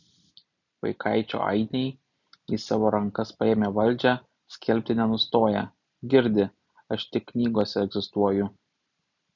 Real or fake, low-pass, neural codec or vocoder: real; 7.2 kHz; none